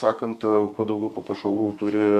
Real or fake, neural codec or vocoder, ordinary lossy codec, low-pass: fake; codec, 32 kHz, 1.9 kbps, SNAC; Opus, 64 kbps; 14.4 kHz